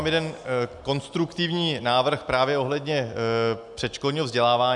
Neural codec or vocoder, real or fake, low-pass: none; real; 10.8 kHz